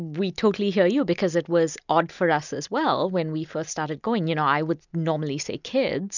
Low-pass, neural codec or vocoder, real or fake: 7.2 kHz; none; real